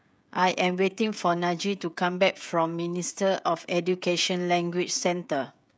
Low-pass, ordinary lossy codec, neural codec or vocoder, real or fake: none; none; codec, 16 kHz, 16 kbps, FreqCodec, smaller model; fake